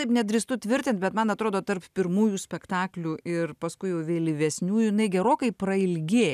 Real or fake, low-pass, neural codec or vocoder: real; 14.4 kHz; none